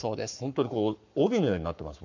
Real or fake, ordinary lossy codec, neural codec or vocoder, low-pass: fake; none; codec, 44.1 kHz, 7.8 kbps, Pupu-Codec; 7.2 kHz